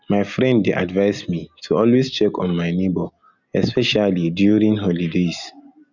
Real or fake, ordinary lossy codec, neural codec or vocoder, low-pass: real; none; none; 7.2 kHz